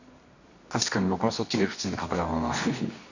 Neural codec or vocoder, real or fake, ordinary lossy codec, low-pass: codec, 24 kHz, 0.9 kbps, WavTokenizer, medium music audio release; fake; none; 7.2 kHz